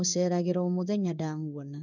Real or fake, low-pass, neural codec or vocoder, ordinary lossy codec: fake; 7.2 kHz; codec, 16 kHz in and 24 kHz out, 1 kbps, XY-Tokenizer; none